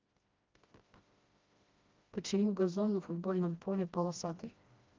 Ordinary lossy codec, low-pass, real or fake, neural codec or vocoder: Opus, 32 kbps; 7.2 kHz; fake; codec, 16 kHz, 1 kbps, FreqCodec, smaller model